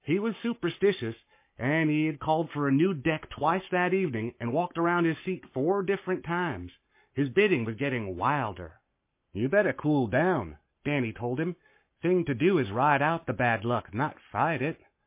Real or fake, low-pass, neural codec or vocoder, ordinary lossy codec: fake; 3.6 kHz; codec, 44.1 kHz, 7.8 kbps, Pupu-Codec; MP3, 24 kbps